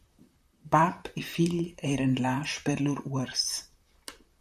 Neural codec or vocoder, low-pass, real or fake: vocoder, 44.1 kHz, 128 mel bands, Pupu-Vocoder; 14.4 kHz; fake